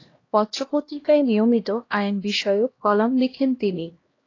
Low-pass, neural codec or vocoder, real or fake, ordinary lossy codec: 7.2 kHz; codec, 16 kHz, 1 kbps, X-Codec, HuBERT features, trained on LibriSpeech; fake; AAC, 32 kbps